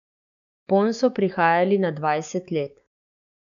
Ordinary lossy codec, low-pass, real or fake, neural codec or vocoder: none; 7.2 kHz; fake; codec, 16 kHz, 6 kbps, DAC